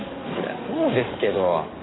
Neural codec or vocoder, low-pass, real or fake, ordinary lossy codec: codec, 44.1 kHz, 7.8 kbps, DAC; 7.2 kHz; fake; AAC, 16 kbps